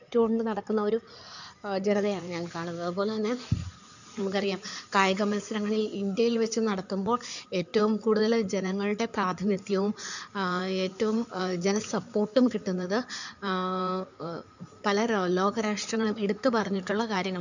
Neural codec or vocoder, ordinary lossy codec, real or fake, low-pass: codec, 16 kHz in and 24 kHz out, 2.2 kbps, FireRedTTS-2 codec; none; fake; 7.2 kHz